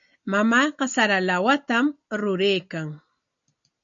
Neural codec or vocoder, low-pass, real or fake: none; 7.2 kHz; real